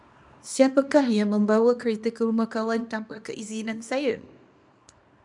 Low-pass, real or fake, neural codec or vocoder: 10.8 kHz; fake; codec, 24 kHz, 0.9 kbps, WavTokenizer, small release